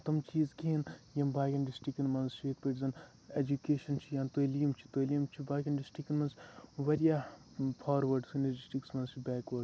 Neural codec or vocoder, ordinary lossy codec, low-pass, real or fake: none; none; none; real